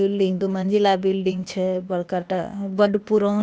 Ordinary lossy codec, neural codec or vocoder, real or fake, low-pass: none; codec, 16 kHz, 0.8 kbps, ZipCodec; fake; none